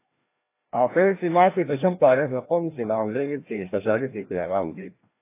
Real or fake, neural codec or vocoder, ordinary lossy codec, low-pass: fake; codec, 16 kHz, 1 kbps, FreqCodec, larger model; AAC, 24 kbps; 3.6 kHz